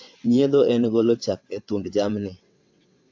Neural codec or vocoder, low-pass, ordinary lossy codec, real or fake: vocoder, 22.05 kHz, 80 mel bands, WaveNeXt; 7.2 kHz; AAC, 48 kbps; fake